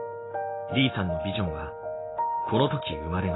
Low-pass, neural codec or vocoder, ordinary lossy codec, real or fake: 7.2 kHz; none; AAC, 16 kbps; real